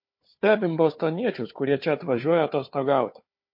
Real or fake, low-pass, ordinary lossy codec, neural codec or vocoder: fake; 5.4 kHz; MP3, 32 kbps; codec, 16 kHz, 4 kbps, FunCodec, trained on Chinese and English, 50 frames a second